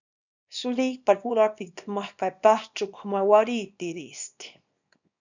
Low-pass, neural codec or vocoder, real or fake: 7.2 kHz; codec, 24 kHz, 0.9 kbps, WavTokenizer, small release; fake